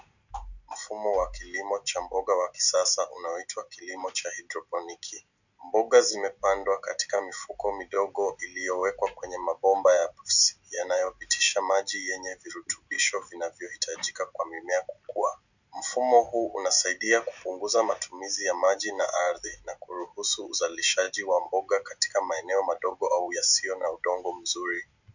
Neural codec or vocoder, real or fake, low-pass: none; real; 7.2 kHz